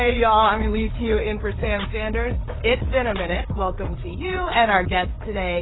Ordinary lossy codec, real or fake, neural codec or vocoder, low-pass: AAC, 16 kbps; fake; codec, 16 kHz, 4 kbps, FreqCodec, larger model; 7.2 kHz